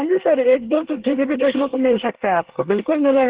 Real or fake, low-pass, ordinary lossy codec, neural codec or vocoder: fake; 3.6 kHz; Opus, 16 kbps; codec, 24 kHz, 1 kbps, SNAC